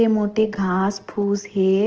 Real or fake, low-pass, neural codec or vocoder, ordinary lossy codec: real; 7.2 kHz; none; Opus, 16 kbps